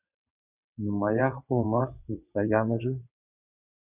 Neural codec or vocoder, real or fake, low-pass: vocoder, 22.05 kHz, 80 mel bands, WaveNeXt; fake; 3.6 kHz